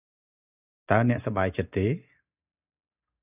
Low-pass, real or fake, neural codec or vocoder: 3.6 kHz; real; none